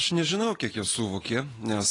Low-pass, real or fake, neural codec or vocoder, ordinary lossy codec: 10.8 kHz; real; none; AAC, 32 kbps